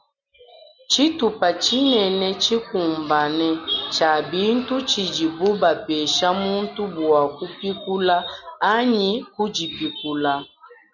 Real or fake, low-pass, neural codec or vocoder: real; 7.2 kHz; none